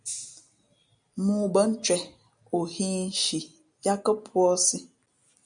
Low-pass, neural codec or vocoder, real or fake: 9.9 kHz; none; real